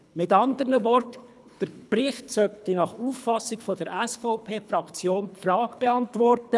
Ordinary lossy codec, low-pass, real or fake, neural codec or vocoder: none; none; fake; codec, 24 kHz, 3 kbps, HILCodec